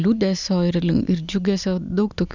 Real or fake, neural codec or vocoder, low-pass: real; none; 7.2 kHz